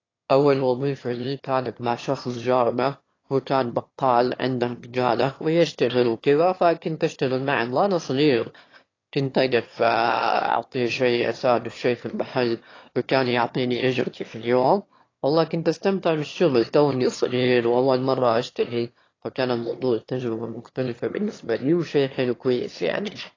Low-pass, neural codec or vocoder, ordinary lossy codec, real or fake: 7.2 kHz; autoencoder, 22.05 kHz, a latent of 192 numbers a frame, VITS, trained on one speaker; AAC, 32 kbps; fake